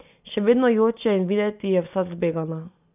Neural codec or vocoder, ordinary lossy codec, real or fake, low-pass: none; none; real; 3.6 kHz